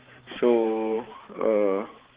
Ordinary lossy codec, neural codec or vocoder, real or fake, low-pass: Opus, 16 kbps; codec, 16 kHz, 4 kbps, X-Codec, HuBERT features, trained on balanced general audio; fake; 3.6 kHz